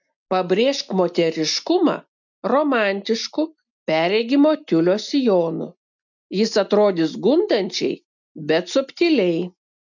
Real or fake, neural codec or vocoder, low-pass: real; none; 7.2 kHz